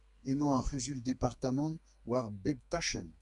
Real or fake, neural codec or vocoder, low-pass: fake; codec, 32 kHz, 1.9 kbps, SNAC; 10.8 kHz